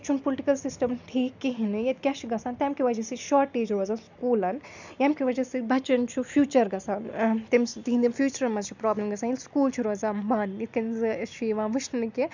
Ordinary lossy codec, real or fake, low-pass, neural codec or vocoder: none; real; 7.2 kHz; none